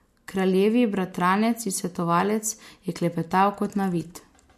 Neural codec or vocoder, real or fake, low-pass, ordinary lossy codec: none; real; 14.4 kHz; MP3, 64 kbps